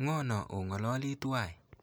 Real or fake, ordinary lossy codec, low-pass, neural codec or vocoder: real; none; none; none